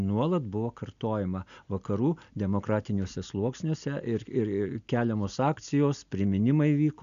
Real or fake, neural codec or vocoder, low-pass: real; none; 7.2 kHz